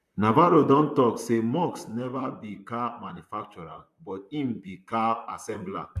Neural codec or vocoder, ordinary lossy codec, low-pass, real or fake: vocoder, 44.1 kHz, 128 mel bands, Pupu-Vocoder; AAC, 96 kbps; 14.4 kHz; fake